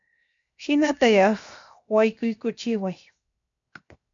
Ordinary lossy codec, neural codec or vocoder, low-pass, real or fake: MP3, 64 kbps; codec, 16 kHz, 0.7 kbps, FocalCodec; 7.2 kHz; fake